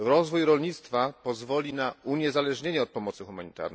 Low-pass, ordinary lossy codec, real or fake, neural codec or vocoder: none; none; real; none